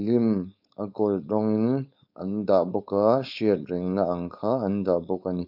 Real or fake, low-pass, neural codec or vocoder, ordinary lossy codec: fake; 5.4 kHz; codec, 16 kHz, 4.8 kbps, FACodec; none